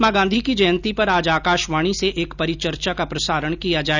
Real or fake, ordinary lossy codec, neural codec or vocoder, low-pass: real; none; none; 7.2 kHz